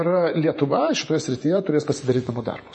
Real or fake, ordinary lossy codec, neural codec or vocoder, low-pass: fake; MP3, 32 kbps; vocoder, 48 kHz, 128 mel bands, Vocos; 10.8 kHz